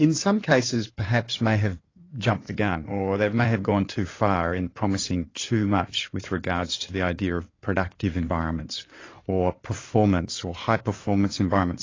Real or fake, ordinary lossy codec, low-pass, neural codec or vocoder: fake; AAC, 32 kbps; 7.2 kHz; codec, 16 kHz in and 24 kHz out, 2.2 kbps, FireRedTTS-2 codec